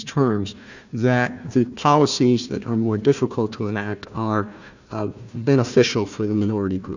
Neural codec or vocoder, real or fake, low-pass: codec, 16 kHz, 1 kbps, FunCodec, trained on Chinese and English, 50 frames a second; fake; 7.2 kHz